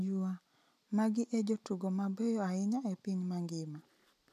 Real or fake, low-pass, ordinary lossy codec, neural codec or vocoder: real; 14.4 kHz; none; none